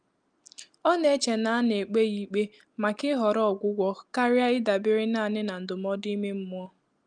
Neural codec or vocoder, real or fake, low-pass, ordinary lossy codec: none; real; 9.9 kHz; Opus, 32 kbps